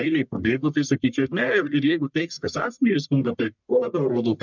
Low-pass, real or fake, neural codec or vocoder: 7.2 kHz; fake; codec, 44.1 kHz, 1.7 kbps, Pupu-Codec